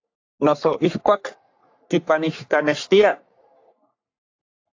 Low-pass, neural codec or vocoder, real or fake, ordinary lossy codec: 7.2 kHz; codec, 44.1 kHz, 3.4 kbps, Pupu-Codec; fake; AAC, 48 kbps